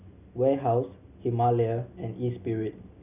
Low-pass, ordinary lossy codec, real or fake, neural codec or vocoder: 3.6 kHz; none; real; none